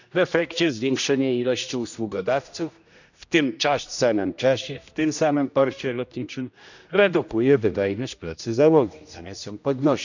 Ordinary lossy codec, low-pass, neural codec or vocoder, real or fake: none; 7.2 kHz; codec, 16 kHz, 1 kbps, X-Codec, HuBERT features, trained on general audio; fake